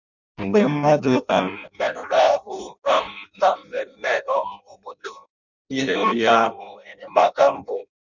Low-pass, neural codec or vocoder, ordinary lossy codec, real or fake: 7.2 kHz; codec, 16 kHz in and 24 kHz out, 0.6 kbps, FireRedTTS-2 codec; none; fake